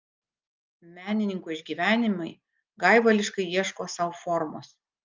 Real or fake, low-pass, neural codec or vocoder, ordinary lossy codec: real; 7.2 kHz; none; Opus, 24 kbps